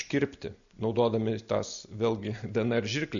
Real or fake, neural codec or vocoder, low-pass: real; none; 7.2 kHz